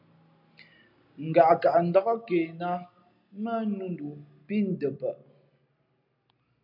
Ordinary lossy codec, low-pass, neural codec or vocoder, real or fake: AAC, 48 kbps; 5.4 kHz; none; real